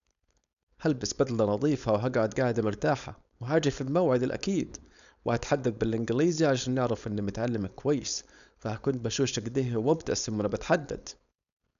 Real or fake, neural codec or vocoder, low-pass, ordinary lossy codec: fake; codec, 16 kHz, 4.8 kbps, FACodec; 7.2 kHz; none